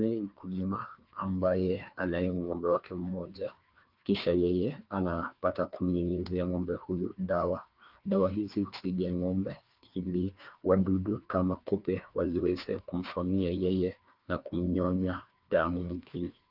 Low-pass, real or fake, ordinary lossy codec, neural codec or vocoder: 5.4 kHz; fake; Opus, 32 kbps; codec, 16 kHz, 2 kbps, FreqCodec, larger model